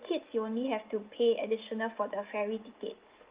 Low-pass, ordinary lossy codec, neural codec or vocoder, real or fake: 3.6 kHz; Opus, 32 kbps; none; real